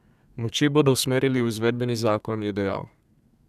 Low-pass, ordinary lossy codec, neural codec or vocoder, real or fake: 14.4 kHz; none; codec, 32 kHz, 1.9 kbps, SNAC; fake